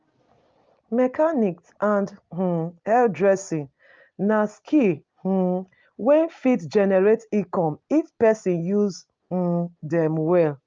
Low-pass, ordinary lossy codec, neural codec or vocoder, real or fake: 7.2 kHz; Opus, 24 kbps; none; real